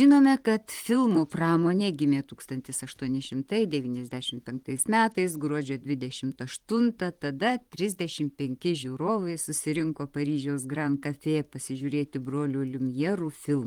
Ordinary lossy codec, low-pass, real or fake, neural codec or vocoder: Opus, 24 kbps; 19.8 kHz; fake; vocoder, 44.1 kHz, 128 mel bands, Pupu-Vocoder